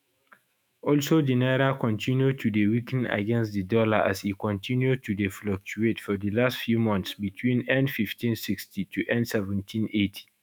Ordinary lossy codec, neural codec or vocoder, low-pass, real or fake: none; autoencoder, 48 kHz, 128 numbers a frame, DAC-VAE, trained on Japanese speech; none; fake